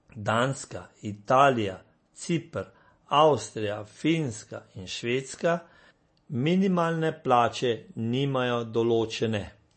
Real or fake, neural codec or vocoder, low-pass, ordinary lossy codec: real; none; 9.9 kHz; MP3, 32 kbps